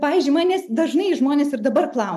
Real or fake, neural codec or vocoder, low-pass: real; none; 14.4 kHz